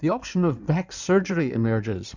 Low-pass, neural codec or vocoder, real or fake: 7.2 kHz; codec, 16 kHz in and 24 kHz out, 2.2 kbps, FireRedTTS-2 codec; fake